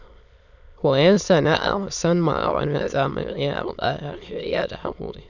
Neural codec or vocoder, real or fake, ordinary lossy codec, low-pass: autoencoder, 22.05 kHz, a latent of 192 numbers a frame, VITS, trained on many speakers; fake; none; 7.2 kHz